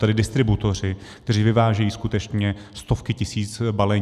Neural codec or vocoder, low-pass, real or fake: none; 14.4 kHz; real